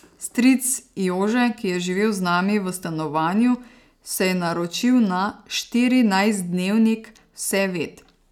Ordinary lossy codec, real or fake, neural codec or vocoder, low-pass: none; real; none; 19.8 kHz